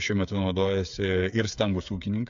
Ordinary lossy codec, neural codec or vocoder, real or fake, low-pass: AAC, 64 kbps; codec, 16 kHz, 8 kbps, FreqCodec, smaller model; fake; 7.2 kHz